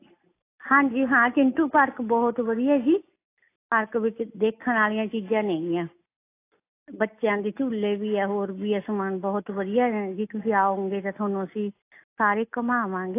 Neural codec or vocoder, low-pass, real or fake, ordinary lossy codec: none; 3.6 kHz; real; AAC, 24 kbps